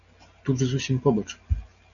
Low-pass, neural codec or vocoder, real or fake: 7.2 kHz; none; real